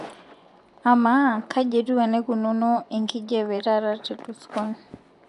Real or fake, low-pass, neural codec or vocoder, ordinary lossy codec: fake; 10.8 kHz; vocoder, 24 kHz, 100 mel bands, Vocos; AAC, 96 kbps